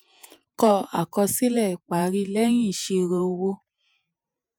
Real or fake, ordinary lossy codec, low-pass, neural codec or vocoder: fake; none; none; vocoder, 48 kHz, 128 mel bands, Vocos